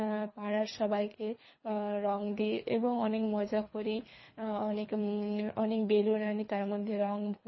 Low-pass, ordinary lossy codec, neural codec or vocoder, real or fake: 7.2 kHz; MP3, 24 kbps; codec, 24 kHz, 3 kbps, HILCodec; fake